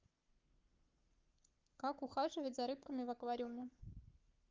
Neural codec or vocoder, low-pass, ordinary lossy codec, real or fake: codec, 44.1 kHz, 7.8 kbps, Pupu-Codec; 7.2 kHz; Opus, 24 kbps; fake